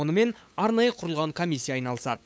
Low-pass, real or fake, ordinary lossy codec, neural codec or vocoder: none; fake; none; codec, 16 kHz, 8 kbps, FunCodec, trained on LibriTTS, 25 frames a second